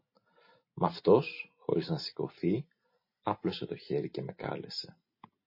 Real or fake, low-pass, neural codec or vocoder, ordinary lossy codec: real; 5.4 kHz; none; MP3, 24 kbps